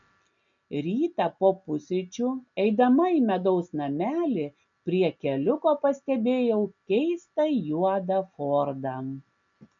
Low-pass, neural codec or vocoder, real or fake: 7.2 kHz; none; real